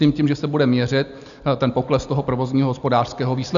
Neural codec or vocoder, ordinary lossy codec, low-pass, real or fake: none; MP3, 96 kbps; 7.2 kHz; real